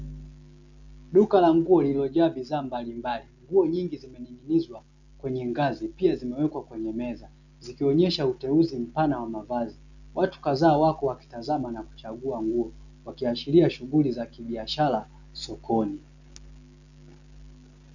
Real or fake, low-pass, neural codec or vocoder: real; 7.2 kHz; none